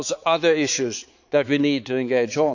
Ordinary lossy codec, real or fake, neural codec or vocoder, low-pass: none; fake; codec, 16 kHz, 4 kbps, X-Codec, HuBERT features, trained on balanced general audio; 7.2 kHz